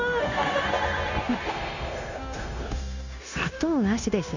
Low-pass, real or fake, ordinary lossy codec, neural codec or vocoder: 7.2 kHz; fake; none; codec, 16 kHz, 0.9 kbps, LongCat-Audio-Codec